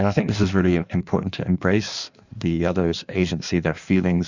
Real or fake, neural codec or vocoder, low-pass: fake; codec, 16 kHz in and 24 kHz out, 1.1 kbps, FireRedTTS-2 codec; 7.2 kHz